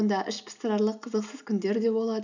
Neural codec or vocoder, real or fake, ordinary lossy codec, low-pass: none; real; none; 7.2 kHz